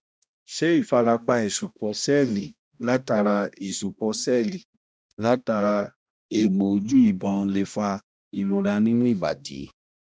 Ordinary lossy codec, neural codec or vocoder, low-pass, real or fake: none; codec, 16 kHz, 1 kbps, X-Codec, HuBERT features, trained on balanced general audio; none; fake